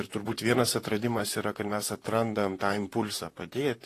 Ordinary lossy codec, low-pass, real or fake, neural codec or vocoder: AAC, 48 kbps; 14.4 kHz; fake; vocoder, 44.1 kHz, 128 mel bands, Pupu-Vocoder